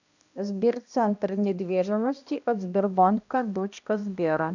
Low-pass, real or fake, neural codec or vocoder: 7.2 kHz; fake; codec, 16 kHz, 1 kbps, X-Codec, HuBERT features, trained on balanced general audio